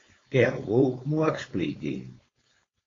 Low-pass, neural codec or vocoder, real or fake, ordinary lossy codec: 7.2 kHz; codec, 16 kHz, 4.8 kbps, FACodec; fake; AAC, 32 kbps